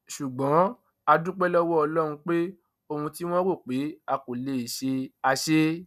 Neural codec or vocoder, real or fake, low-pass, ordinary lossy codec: none; real; 14.4 kHz; none